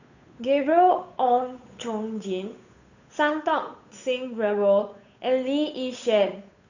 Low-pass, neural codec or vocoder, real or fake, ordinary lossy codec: 7.2 kHz; codec, 16 kHz, 8 kbps, FunCodec, trained on Chinese and English, 25 frames a second; fake; none